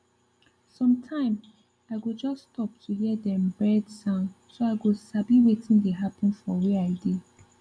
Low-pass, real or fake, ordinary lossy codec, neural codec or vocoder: 9.9 kHz; real; none; none